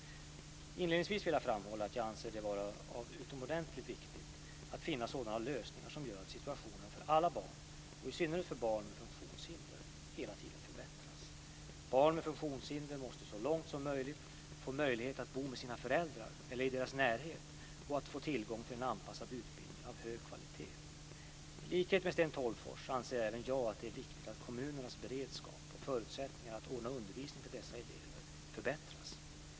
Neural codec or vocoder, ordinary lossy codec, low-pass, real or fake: none; none; none; real